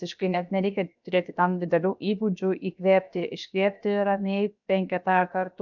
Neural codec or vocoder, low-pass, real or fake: codec, 16 kHz, 0.7 kbps, FocalCodec; 7.2 kHz; fake